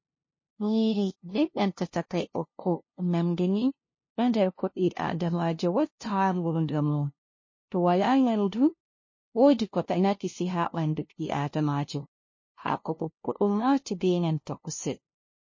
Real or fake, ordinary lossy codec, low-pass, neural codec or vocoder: fake; MP3, 32 kbps; 7.2 kHz; codec, 16 kHz, 0.5 kbps, FunCodec, trained on LibriTTS, 25 frames a second